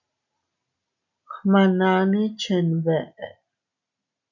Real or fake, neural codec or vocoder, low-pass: real; none; 7.2 kHz